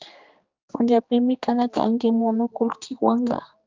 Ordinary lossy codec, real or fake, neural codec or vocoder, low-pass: Opus, 24 kbps; fake; codec, 16 kHz, 2 kbps, X-Codec, HuBERT features, trained on general audio; 7.2 kHz